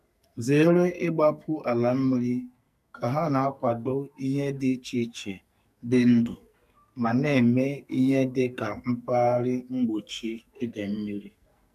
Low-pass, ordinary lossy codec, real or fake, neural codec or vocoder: 14.4 kHz; none; fake; codec, 44.1 kHz, 2.6 kbps, SNAC